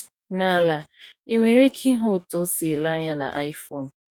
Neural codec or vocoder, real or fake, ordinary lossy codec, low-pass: codec, 44.1 kHz, 2.6 kbps, DAC; fake; none; 19.8 kHz